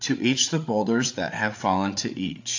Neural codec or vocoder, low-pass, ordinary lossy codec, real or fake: codec, 16 kHz, 8 kbps, FreqCodec, larger model; 7.2 kHz; AAC, 48 kbps; fake